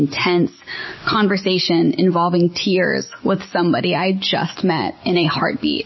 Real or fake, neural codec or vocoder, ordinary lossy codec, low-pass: real; none; MP3, 24 kbps; 7.2 kHz